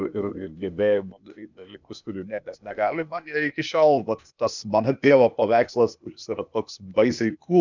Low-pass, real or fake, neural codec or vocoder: 7.2 kHz; fake; codec, 16 kHz, 0.8 kbps, ZipCodec